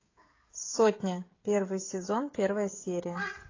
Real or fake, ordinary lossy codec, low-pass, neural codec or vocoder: fake; AAC, 32 kbps; 7.2 kHz; codec, 16 kHz, 16 kbps, FreqCodec, smaller model